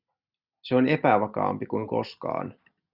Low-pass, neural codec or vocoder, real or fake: 5.4 kHz; none; real